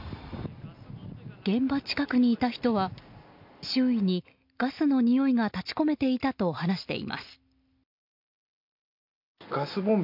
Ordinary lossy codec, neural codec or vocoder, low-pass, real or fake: MP3, 48 kbps; none; 5.4 kHz; real